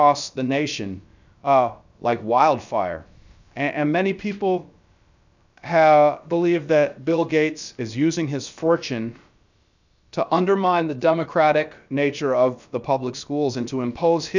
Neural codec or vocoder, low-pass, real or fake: codec, 16 kHz, about 1 kbps, DyCAST, with the encoder's durations; 7.2 kHz; fake